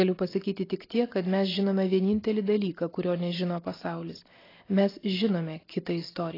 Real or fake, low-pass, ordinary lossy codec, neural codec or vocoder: real; 5.4 kHz; AAC, 24 kbps; none